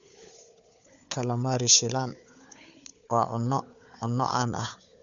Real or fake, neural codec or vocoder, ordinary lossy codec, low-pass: fake; codec, 16 kHz, 4 kbps, FunCodec, trained on Chinese and English, 50 frames a second; none; 7.2 kHz